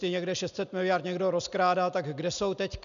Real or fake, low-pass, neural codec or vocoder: real; 7.2 kHz; none